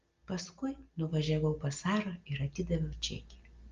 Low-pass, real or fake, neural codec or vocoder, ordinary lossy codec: 7.2 kHz; real; none; Opus, 32 kbps